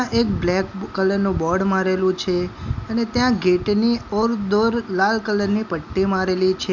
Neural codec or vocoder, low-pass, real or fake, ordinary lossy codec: none; 7.2 kHz; real; none